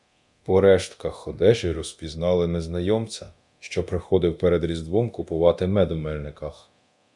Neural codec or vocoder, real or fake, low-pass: codec, 24 kHz, 0.9 kbps, DualCodec; fake; 10.8 kHz